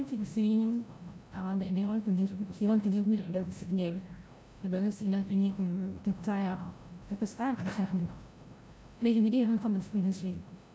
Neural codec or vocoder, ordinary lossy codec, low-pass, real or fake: codec, 16 kHz, 0.5 kbps, FreqCodec, larger model; none; none; fake